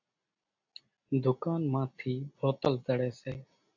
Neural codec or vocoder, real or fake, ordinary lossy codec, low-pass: none; real; AAC, 48 kbps; 7.2 kHz